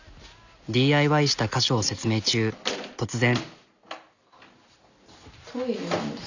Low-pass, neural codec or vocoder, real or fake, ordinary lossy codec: 7.2 kHz; none; real; none